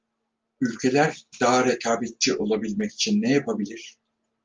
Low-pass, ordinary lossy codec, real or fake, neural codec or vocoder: 7.2 kHz; Opus, 32 kbps; real; none